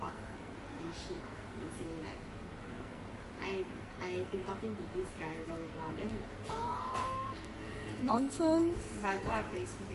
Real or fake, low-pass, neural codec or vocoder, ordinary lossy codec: fake; 14.4 kHz; codec, 44.1 kHz, 2.6 kbps, SNAC; MP3, 48 kbps